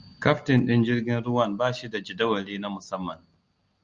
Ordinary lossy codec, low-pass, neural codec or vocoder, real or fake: Opus, 32 kbps; 7.2 kHz; none; real